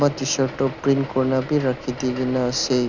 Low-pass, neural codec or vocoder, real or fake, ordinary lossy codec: 7.2 kHz; none; real; none